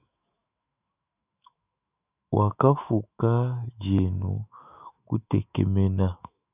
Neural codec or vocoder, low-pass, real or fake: autoencoder, 48 kHz, 128 numbers a frame, DAC-VAE, trained on Japanese speech; 3.6 kHz; fake